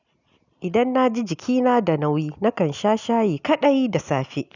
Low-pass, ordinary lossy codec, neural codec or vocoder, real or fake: 7.2 kHz; none; none; real